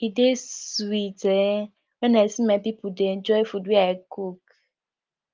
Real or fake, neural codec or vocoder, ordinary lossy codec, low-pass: real; none; Opus, 24 kbps; 7.2 kHz